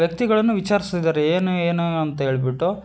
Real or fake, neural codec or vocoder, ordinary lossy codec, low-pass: real; none; none; none